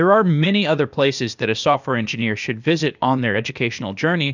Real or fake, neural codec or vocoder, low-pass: fake; codec, 16 kHz, 0.8 kbps, ZipCodec; 7.2 kHz